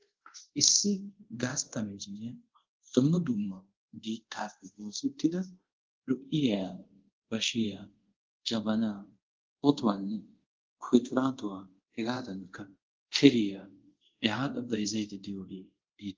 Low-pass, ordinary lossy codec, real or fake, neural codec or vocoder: 7.2 kHz; Opus, 16 kbps; fake; codec, 24 kHz, 0.5 kbps, DualCodec